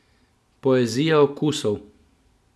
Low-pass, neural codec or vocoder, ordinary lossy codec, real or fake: none; none; none; real